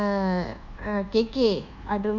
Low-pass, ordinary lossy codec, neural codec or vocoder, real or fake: 7.2 kHz; none; codec, 24 kHz, 1.2 kbps, DualCodec; fake